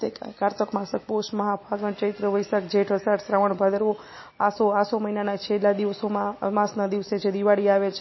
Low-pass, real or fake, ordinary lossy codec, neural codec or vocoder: 7.2 kHz; real; MP3, 24 kbps; none